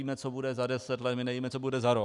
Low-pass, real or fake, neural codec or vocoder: 10.8 kHz; real; none